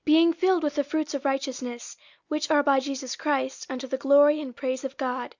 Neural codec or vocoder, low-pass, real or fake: none; 7.2 kHz; real